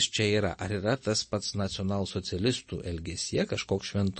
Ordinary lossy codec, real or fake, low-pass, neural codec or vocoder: MP3, 32 kbps; real; 10.8 kHz; none